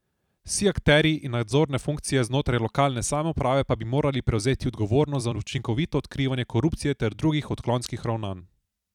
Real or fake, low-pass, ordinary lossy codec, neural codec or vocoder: fake; 19.8 kHz; none; vocoder, 44.1 kHz, 128 mel bands every 256 samples, BigVGAN v2